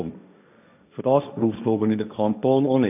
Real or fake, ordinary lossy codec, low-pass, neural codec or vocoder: fake; none; 3.6 kHz; codec, 16 kHz, 1.1 kbps, Voila-Tokenizer